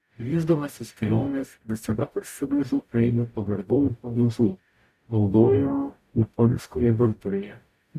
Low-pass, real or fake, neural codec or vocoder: 14.4 kHz; fake; codec, 44.1 kHz, 0.9 kbps, DAC